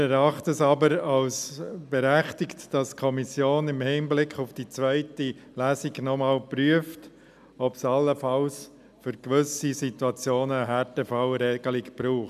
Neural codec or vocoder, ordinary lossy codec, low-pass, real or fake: none; none; 14.4 kHz; real